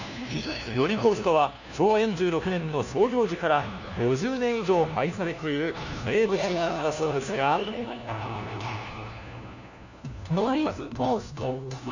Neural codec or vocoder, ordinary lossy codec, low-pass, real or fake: codec, 16 kHz, 1 kbps, FunCodec, trained on LibriTTS, 50 frames a second; none; 7.2 kHz; fake